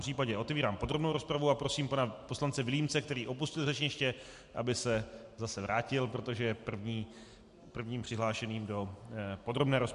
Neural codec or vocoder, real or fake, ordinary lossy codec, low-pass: none; real; MP3, 64 kbps; 10.8 kHz